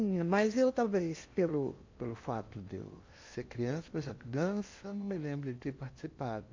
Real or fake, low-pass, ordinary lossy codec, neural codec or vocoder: fake; 7.2 kHz; MP3, 48 kbps; codec, 16 kHz in and 24 kHz out, 0.8 kbps, FocalCodec, streaming, 65536 codes